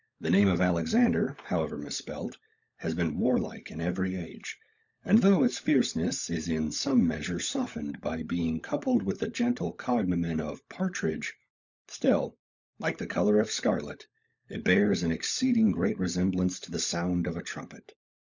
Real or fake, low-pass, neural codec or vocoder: fake; 7.2 kHz; codec, 16 kHz, 16 kbps, FunCodec, trained on LibriTTS, 50 frames a second